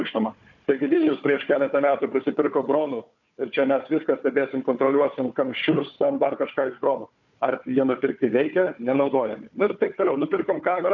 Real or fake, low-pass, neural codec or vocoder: fake; 7.2 kHz; codec, 16 kHz, 4 kbps, FunCodec, trained on Chinese and English, 50 frames a second